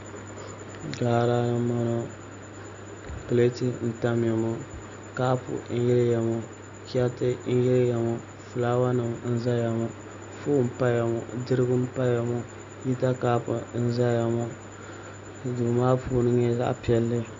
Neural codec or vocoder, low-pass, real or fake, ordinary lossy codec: none; 7.2 kHz; real; AAC, 64 kbps